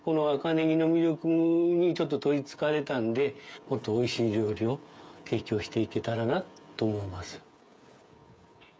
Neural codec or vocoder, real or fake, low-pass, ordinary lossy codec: codec, 16 kHz, 16 kbps, FreqCodec, smaller model; fake; none; none